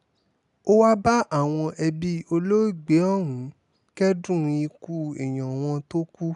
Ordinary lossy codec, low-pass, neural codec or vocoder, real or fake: none; 10.8 kHz; none; real